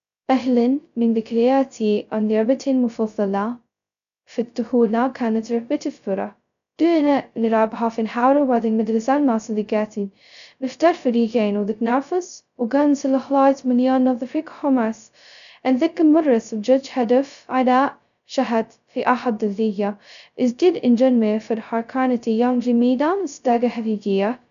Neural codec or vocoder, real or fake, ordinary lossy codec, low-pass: codec, 16 kHz, 0.2 kbps, FocalCodec; fake; none; 7.2 kHz